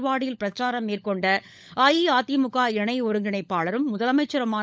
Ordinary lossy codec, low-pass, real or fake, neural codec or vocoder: none; none; fake; codec, 16 kHz, 16 kbps, FunCodec, trained on LibriTTS, 50 frames a second